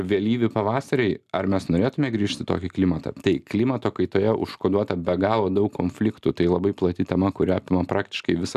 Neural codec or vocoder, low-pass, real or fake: none; 14.4 kHz; real